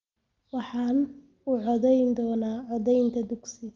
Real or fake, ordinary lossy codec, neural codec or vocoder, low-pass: real; Opus, 32 kbps; none; 7.2 kHz